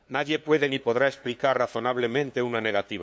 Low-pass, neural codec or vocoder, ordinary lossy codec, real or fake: none; codec, 16 kHz, 2 kbps, FunCodec, trained on LibriTTS, 25 frames a second; none; fake